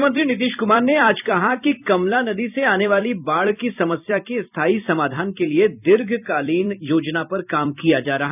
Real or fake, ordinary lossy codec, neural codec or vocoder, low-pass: fake; none; vocoder, 44.1 kHz, 128 mel bands every 256 samples, BigVGAN v2; 3.6 kHz